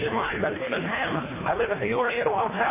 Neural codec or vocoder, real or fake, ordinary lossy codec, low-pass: codec, 24 kHz, 1.5 kbps, HILCodec; fake; MP3, 16 kbps; 3.6 kHz